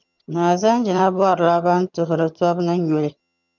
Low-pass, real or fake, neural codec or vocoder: 7.2 kHz; fake; vocoder, 22.05 kHz, 80 mel bands, HiFi-GAN